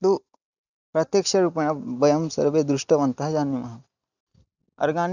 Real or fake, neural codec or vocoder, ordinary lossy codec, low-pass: real; none; none; 7.2 kHz